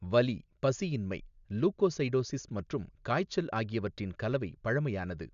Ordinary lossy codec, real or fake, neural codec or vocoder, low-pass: none; real; none; 7.2 kHz